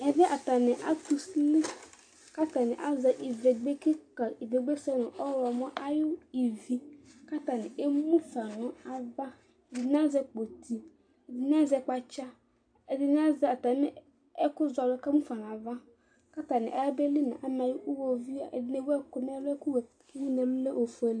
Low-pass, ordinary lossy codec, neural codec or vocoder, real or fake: 9.9 kHz; AAC, 64 kbps; none; real